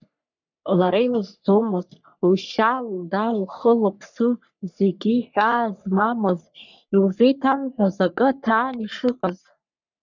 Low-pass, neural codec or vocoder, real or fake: 7.2 kHz; codec, 44.1 kHz, 3.4 kbps, Pupu-Codec; fake